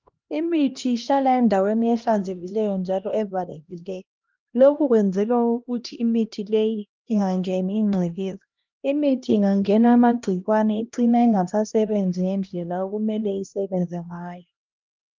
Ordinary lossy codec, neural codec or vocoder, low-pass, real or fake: Opus, 24 kbps; codec, 16 kHz, 1 kbps, X-Codec, HuBERT features, trained on LibriSpeech; 7.2 kHz; fake